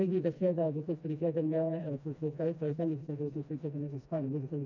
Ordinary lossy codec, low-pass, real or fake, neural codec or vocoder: none; 7.2 kHz; fake; codec, 16 kHz, 1 kbps, FreqCodec, smaller model